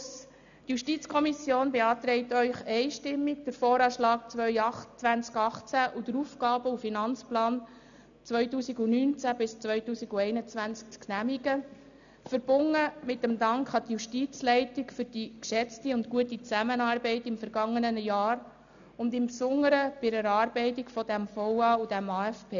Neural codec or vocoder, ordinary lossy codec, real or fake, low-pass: none; none; real; 7.2 kHz